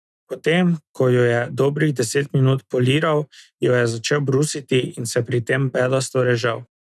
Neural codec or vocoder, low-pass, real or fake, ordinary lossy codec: none; none; real; none